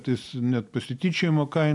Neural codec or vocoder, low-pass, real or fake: none; 10.8 kHz; real